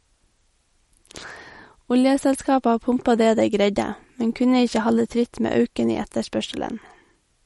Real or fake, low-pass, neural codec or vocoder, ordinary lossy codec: real; 19.8 kHz; none; MP3, 48 kbps